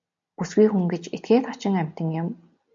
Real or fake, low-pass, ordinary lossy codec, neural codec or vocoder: real; 7.2 kHz; MP3, 48 kbps; none